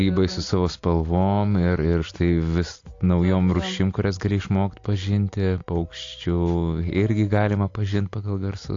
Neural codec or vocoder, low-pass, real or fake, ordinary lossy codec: none; 7.2 kHz; real; AAC, 48 kbps